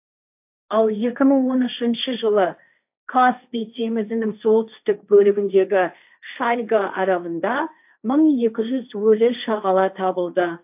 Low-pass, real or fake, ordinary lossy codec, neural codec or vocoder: 3.6 kHz; fake; AAC, 32 kbps; codec, 16 kHz, 1.1 kbps, Voila-Tokenizer